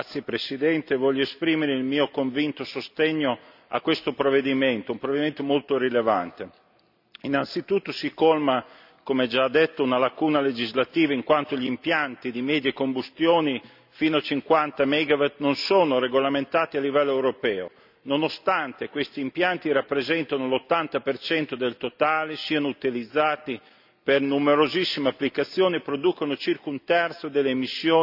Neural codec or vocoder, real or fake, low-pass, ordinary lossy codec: none; real; 5.4 kHz; none